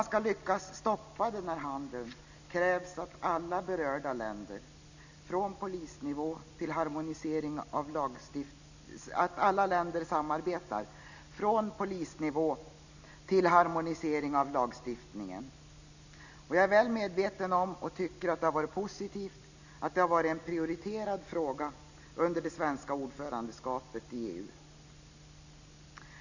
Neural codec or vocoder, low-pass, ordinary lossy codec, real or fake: none; 7.2 kHz; none; real